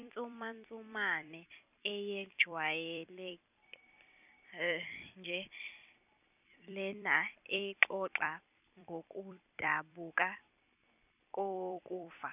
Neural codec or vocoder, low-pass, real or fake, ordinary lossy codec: vocoder, 44.1 kHz, 128 mel bands every 256 samples, BigVGAN v2; 3.6 kHz; fake; none